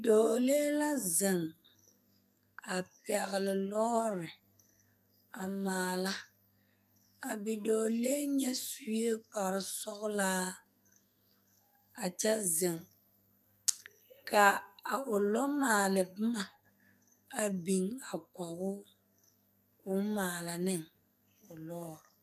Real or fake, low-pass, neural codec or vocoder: fake; 14.4 kHz; codec, 44.1 kHz, 2.6 kbps, SNAC